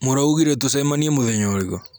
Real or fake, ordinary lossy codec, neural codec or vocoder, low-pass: real; none; none; none